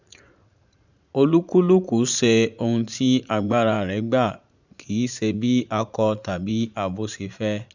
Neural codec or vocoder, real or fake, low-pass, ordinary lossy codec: vocoder, 44.1 kHz, 80 mel bands, Vocos; fake; 7.2 kHz; none